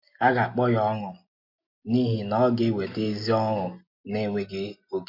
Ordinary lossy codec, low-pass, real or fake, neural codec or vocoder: MP3, 32 kbps; 5.4 kHz; real; none